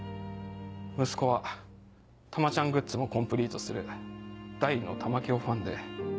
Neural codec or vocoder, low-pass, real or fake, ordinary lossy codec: none; none; real; none